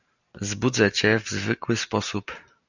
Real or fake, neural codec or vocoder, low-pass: real; none; 7.2 kHz